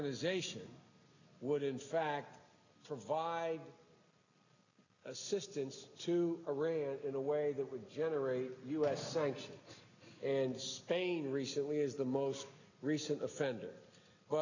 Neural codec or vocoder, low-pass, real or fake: none; 7.2 kHz; real